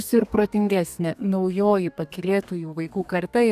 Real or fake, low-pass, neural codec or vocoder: fake; 14.4 kHz; codec, 32 kHz, 1.9 kbps, SNAC